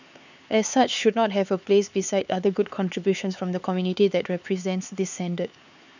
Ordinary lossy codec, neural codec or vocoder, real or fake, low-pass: none; codec, 16 kHz, 4 kbps, X-Codec, HuBERT features, trained on LibriSpeech; fake; 7.2 kHz